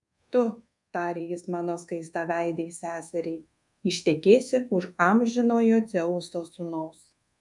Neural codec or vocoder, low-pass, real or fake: codec, 24 kHz, 1.2 kbps, DualCodec; 10.8 kHz; fake